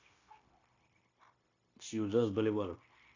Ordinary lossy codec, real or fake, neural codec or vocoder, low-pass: AAC, 32 kbps; fake; codec, 16 kHz, 0.9 kbps, LongCat-Audio-Codec; 7.2 kHz